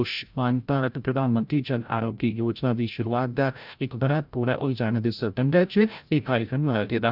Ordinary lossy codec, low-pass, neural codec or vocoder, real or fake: none; 5.4 kHz; codec, 16 kHz, 0.5 kbps, FreqCodec, larger model; fake